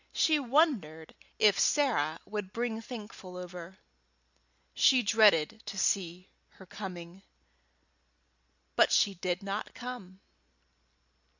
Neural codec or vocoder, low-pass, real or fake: none; 7.2 kHz; real